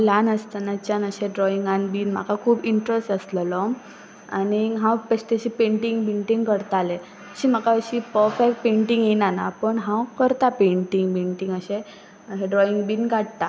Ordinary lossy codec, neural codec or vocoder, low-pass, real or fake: none; none; none; real